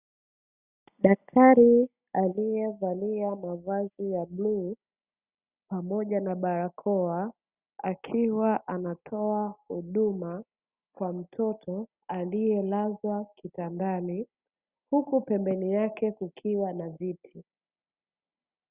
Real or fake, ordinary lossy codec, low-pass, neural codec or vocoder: real; Opus, 64 kbps; 3.6 kHz; none